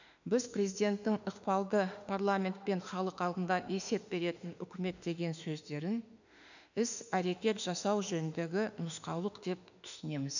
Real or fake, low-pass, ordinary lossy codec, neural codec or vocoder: fake; 7.2 kHz; none; autoencoder, 48 kHz, 32 numbers a frame, DAC-VAE, trained on Japanese speech